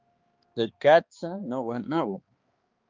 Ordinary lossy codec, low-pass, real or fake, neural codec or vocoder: Opus, 32 kbps; 7.2 kHz; fake; codec, 16 kHz, 2 kbps, X-Codec, HuBERT features, trained on balanced general audio